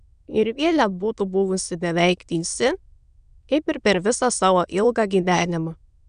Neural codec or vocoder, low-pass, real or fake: autoencoder, 22.05 kHz, a latent of 192 numbers a frame, VITS, trained on many speakers; 9.9 kHz; fake